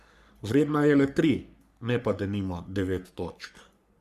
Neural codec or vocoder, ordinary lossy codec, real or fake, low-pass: codec, 44.1 kHz, 3.4 kbps, Pupu-Codec; Opus, 64 kbps; fake; 14.4 kHz